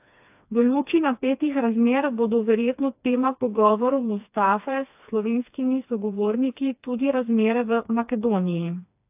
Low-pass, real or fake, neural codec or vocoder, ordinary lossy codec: 3.6 kHz; fake; codec, 16 kHz, 2 kbps, FreqCodec, smaller model; AAC, 32 kbps